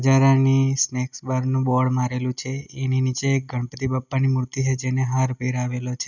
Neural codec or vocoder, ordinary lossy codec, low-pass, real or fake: none; none; 7.2 kHz; real